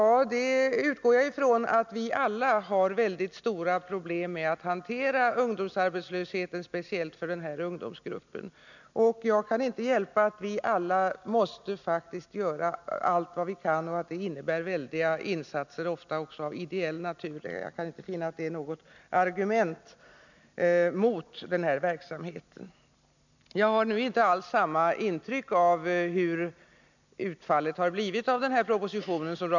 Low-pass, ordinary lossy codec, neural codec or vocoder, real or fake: 7.2 kHz; none; none; real